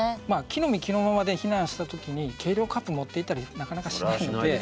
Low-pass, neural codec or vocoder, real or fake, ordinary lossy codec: none; none; real; none